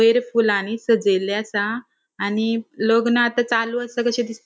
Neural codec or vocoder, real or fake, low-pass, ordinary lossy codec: none; real; none; none